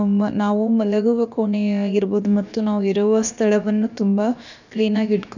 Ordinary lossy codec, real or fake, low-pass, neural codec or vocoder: none; fake; 7.2 kHz; codec, 16 kHz, about 1 kbps, DyCAST, with the encoder's durations